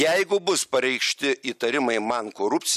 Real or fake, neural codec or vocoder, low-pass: real; none; 14.4 kHz